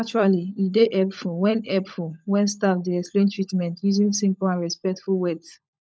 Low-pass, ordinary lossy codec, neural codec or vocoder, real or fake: none; none; codec, 16 kHz, 16 kbps, FunCodec, trained on LibriTTS, 50 frames a second; fake